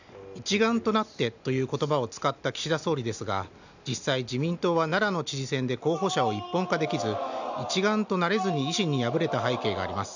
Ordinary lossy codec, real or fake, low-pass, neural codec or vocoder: none; real; 7.2 kHz; none